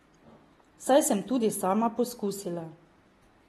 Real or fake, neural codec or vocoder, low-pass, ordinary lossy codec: real; none; 19.8 kHz; AAC, 32 kbps